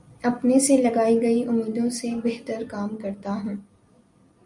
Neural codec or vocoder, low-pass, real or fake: none; 10.8 kHz; real